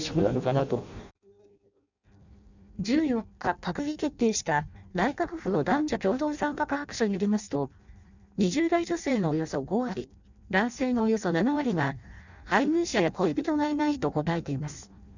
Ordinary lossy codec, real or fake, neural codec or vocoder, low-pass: none; fake; codec, 16 kHz in and 24 kHz out, 0.6 kbps, FireRedTTS-2 codec; 7.2 kHz